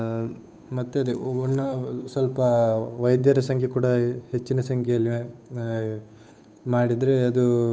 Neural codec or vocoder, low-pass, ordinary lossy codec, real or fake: codec, 16 kHz, 8 kbps, FunCodec, trained on Chinese and English, 25 frames a second; none; none; fake